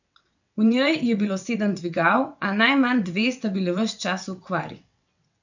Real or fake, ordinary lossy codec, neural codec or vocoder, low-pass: fake; none; vocoder, 22.05 kHz, 80 mel bands, WaveNeXt; 7.2 kHz